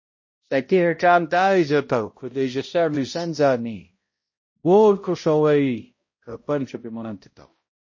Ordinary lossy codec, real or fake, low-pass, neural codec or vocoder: MP3, 32 kbps; fake; 7.2 kHz; codec, 16 kHz, 0.5 kbps, X-Codec, HuBERT features, trained on balanced general audio